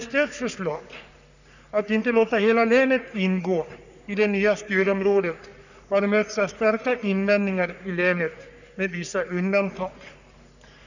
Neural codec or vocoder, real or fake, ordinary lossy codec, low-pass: codec, 44.1 kHz, 3.4 kbps, Pupu-Codec; fake; none; 7.2 kHz